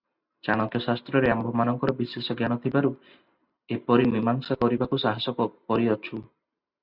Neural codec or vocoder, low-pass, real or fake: none; 5.4 kHz; real